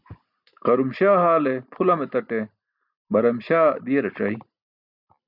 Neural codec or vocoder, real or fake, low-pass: none; real; 5.4 kHz